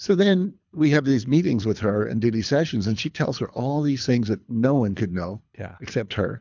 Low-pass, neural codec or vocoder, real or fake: 7.2 kHz; codec, 24 kHz, 3 kbps, HILCodec; fake